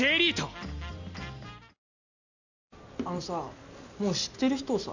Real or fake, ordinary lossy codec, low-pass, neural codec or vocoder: real; none; 7.2 kHz; none